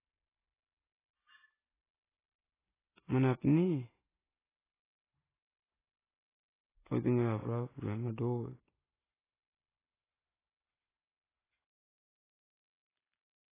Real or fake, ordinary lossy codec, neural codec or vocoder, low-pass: real; AAC, 16 kbps; none; 3.6 kHz